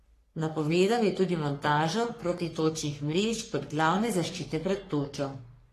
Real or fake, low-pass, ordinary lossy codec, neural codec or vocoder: fake; 14.4 kHz; AAC, 48 kbps; codec, 44.1 kHz, 3.4 kbps, Pupu-Codec